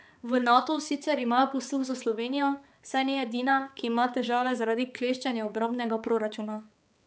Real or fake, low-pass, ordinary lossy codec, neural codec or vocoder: fake; none; none; codec, 16 kHz, 4 kbps, X-Codec, HuBERT features, trained on balanced general audio